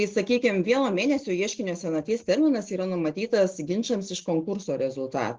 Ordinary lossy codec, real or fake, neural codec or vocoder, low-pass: Opus, 16 kbps; real; none; 7.2 kHz